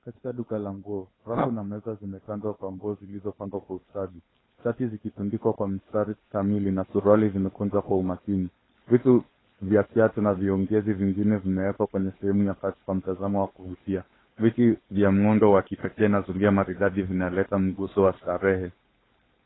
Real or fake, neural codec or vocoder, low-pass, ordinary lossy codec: fake; codec, 16 kHz, 4.8 kbps, FACodec; 7.2 kHz; AAC, 16 kbps